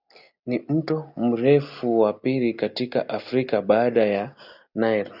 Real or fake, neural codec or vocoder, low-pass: real; none; 5.4 kHz